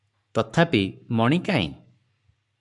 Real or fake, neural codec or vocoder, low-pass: fake; codec, 44.1 kHz, 7.8 kbps, Pupu-Codec; 10.8 kHz